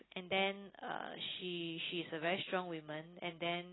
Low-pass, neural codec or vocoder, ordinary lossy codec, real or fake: 7.2 kHz; none; AAC, 16 kbps; real